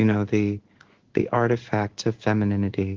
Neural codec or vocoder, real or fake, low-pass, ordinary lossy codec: codec, 16 kHz in and 24 kHz out, 1 kbps, XY-Tokenizer; fake; 7.2 kHz; Opus, 16 kbps